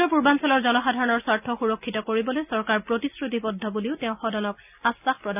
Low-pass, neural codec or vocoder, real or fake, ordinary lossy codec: 3.6 kHz; none; real; none